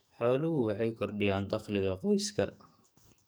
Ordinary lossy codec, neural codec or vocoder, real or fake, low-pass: none; codec, 44.1 kHz, 2.6 kbps, SNAC; fake; none